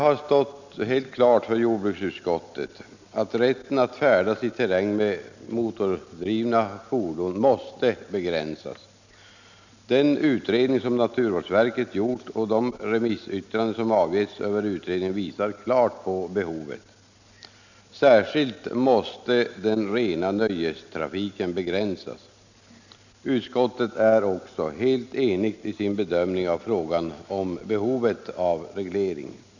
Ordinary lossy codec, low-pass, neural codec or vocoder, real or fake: none; 7.2 kHz; none; real